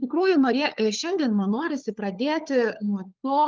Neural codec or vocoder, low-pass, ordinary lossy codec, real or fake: codec, 16 kHz, 4 kbps, FunCodec, trained on LibriTTS, 50 frames a second; 7.2 kHz; Opus, 24 kbps; fake